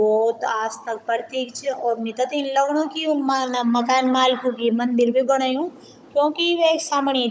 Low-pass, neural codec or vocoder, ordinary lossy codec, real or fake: none; codec, 16 kHz, 16 kbps, FunCodec, trained on Chinese and English, 50 frames a second; none; fake